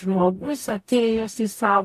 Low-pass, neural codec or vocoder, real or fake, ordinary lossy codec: 14.4 kHz; codec, 44.1 kHz, 0.9 kbps, DAC; fake; AAC, 96 kbps